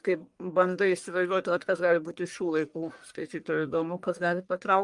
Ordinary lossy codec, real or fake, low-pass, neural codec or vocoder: Opus, 32 kbps; fake; 10.8 kHz; codec, 44.1 kHz, 1.7 kbps, Pupu-Codec